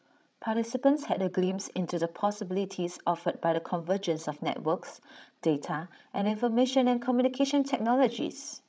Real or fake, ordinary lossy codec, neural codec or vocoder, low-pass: fake; none; codec, 16 kHz, 16 kbps, FreqCodec, larger model; none